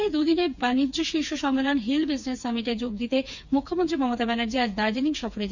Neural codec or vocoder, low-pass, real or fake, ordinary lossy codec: codec, 16 kHz, 4 kbps, FreqCodec, smaller model; 7.2 kHz; fake; none